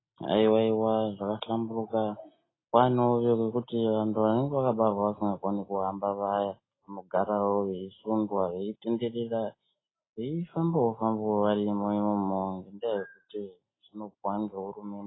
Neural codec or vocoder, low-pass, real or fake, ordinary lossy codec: none; 7.2 kHz; real; AAC, 16 kbps